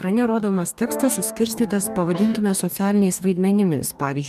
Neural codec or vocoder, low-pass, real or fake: codec, 44.1 kHz, 2.6 kbps, DAC; 14.4 kHz; fake